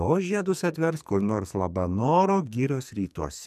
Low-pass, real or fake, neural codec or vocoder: 14.4 kHz; fake; codec, 44.1 kHz, 2.6 kbps, SNAC